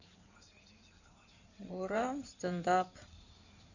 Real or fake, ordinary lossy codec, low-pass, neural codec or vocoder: fake; none; 7.2 kHz; codec, 16 kHz in and 24 kHz out, 2.2 kbps, FireRedTTS-2 codec